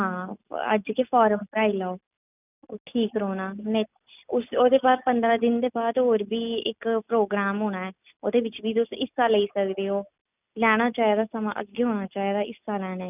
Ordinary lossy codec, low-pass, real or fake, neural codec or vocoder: none; 3.6 kHz; real; none